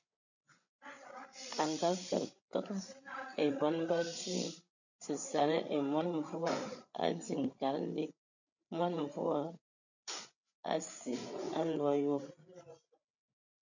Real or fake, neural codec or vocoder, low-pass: fake; codec, 16 kHz, 8 kbps, FreqCodec, larger model; 7.2 kHz